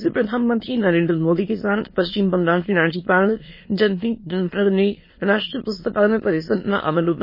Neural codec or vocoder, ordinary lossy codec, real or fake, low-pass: autoencoder, 22.05 kHz, a latent of 192 numbers a frame, VITS, trained on many speakers; MP3, 24 kbps; fake; 5.4 kHz